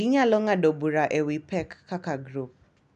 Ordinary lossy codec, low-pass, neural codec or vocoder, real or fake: none; 9.9 kHz; none; real